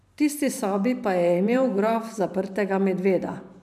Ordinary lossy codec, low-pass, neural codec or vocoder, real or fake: MP3, 96 kbps; 14.4 kHz; vocoder, 44.1 kHz, 128 mel bands every 512 samples, BigVGAN v2; fake